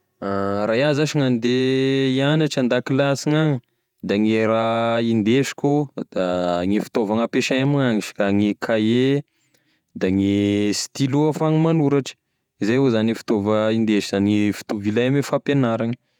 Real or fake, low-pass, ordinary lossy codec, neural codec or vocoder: fake; 19.8 kHz; none; vocoder, 48 kHz, 128 mel bands, Vocos